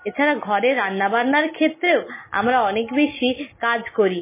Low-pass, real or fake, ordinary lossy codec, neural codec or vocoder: 3.6 kHz; real; MP3, 16 kbps; none